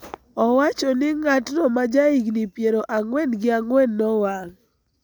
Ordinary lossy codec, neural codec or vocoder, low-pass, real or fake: none; none; none; real